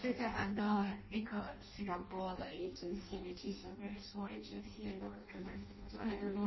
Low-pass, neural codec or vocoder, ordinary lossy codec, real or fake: 7.2 kHz; codec, 16 kHz in and 24 kHz out, 0.6 kbps, FireRedTTS-2 codec; MP3, 24 kbps; fake